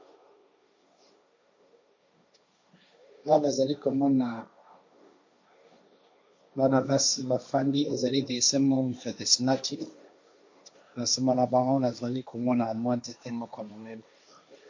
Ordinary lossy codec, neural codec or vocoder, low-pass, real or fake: MP3, 48 kbps; codec, 16 kHz, 1.1 kbps, Voila-Tokenizer; 7.2 kHz; fake